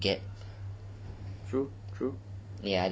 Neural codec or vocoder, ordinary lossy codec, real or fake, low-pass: none; none; real; none